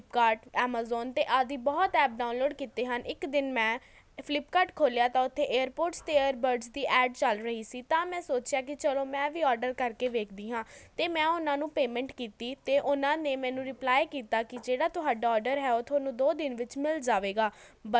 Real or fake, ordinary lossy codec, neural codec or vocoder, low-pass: real; none; none; none